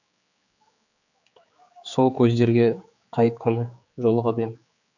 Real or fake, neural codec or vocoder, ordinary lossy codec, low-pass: fake; codec, 16 kHz, 4 kbps, X-Codec, HuBERT features, trained on general audio; none; 7.2 kHz